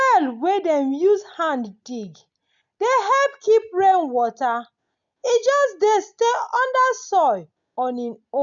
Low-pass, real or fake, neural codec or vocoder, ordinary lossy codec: 7.2 kHz; real; none; none